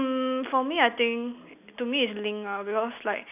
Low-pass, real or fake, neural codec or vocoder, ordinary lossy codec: 3.6 kHz; real; none; none